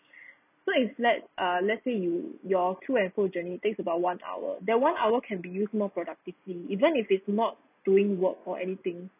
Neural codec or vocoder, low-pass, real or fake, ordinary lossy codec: none; 3.6 kHz; real; none